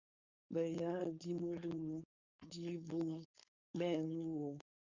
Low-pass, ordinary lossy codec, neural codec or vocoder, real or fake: 7.2 kHz; Opus, 64 kbps; codec, 16 kHz, 4.8 kbps, FACodec; fake